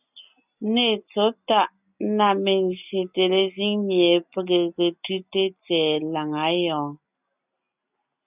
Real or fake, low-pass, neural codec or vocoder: real; 3.6 kHz; none